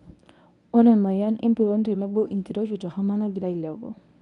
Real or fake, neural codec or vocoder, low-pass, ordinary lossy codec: fake; codec, 24 kHz, 0.9 kbps, WavTokenizer, medium speech release version 1; 10.8 kHz; none